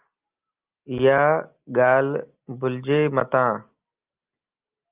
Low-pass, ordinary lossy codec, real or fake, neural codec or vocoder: 3.6 kHz; Opus, 24 kbps; real; none